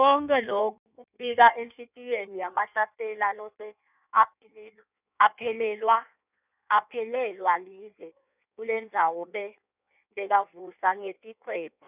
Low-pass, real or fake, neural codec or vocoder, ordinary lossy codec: 3.6 kHz; fake; codec, 16 kHz in and 24 kHz out, 1.1 kbps, FireRedTTS-2 codec; none